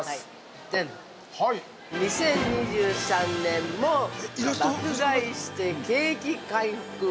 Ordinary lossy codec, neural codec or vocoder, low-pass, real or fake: none; none; none; real